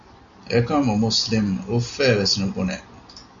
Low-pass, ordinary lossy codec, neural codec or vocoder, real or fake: 7.2 kHz; Opus, 64 kbps; none; real